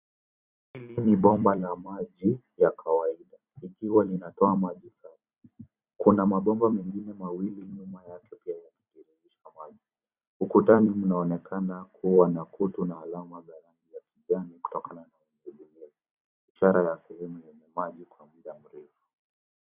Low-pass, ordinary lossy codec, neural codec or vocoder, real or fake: 3.6 kHz; Opus, 64 kbps; vocoder, 44.1 kHz, 128 mel bands every 256 samples, BigVGAN v2; fake